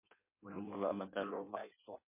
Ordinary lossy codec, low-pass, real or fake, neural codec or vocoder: MP3, 24 kbps; 3.6 kHz; fake; codec, 16 kHz in and 24 kHz out, 0.6 kbps, FireRedTTS-2 codec